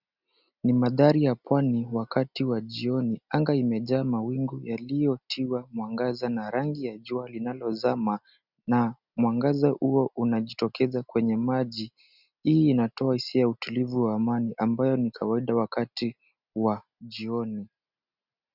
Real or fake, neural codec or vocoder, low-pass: real; none; 5.4 kHz